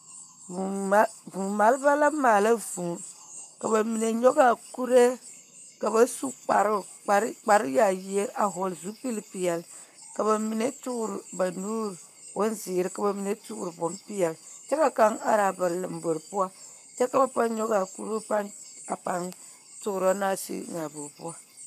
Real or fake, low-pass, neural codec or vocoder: fake; 14.4 kHz; codec, 44.1 kHz, 7.8 kbps, Pupu-Codec